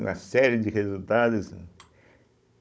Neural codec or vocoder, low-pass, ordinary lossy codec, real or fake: codec, 16 kHz, 16 kbps, FunCodec, trained on Chinese and English, 50 frames a second; none; none; fake